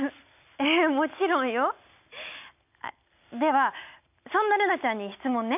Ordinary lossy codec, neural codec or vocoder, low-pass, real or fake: none; none; 3.6 kHz; real